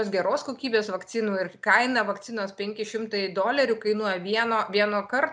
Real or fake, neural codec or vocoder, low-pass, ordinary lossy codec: real; none; 9.9 kHz; MP3, 96 kbps